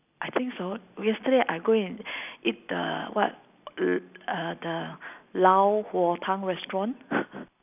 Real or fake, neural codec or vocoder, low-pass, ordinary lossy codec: real; none; 3.6 kHz; none